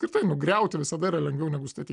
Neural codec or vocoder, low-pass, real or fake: none; 10.8 kHz; real